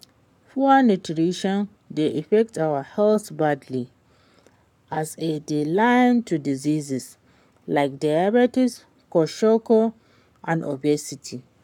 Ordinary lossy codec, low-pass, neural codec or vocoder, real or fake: none; 19.8 kHz; codec, 44.1 kHz, 7.8 kbps, Pupu-Codec; fake